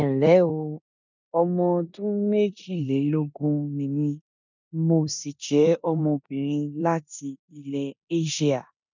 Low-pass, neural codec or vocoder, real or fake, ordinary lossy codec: 7.2 kHz; codec, 16 kHz in and 24 kHz out, 0.9 kbps, LongCat-Audio-Codec, four codebook decoder; fake; none